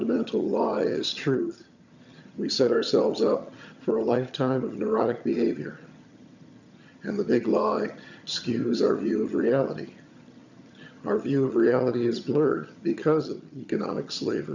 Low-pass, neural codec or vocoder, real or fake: 7.2 kHz; vocoder, 22.05 kHz, 80 mel bands, HiFi-GAN; fake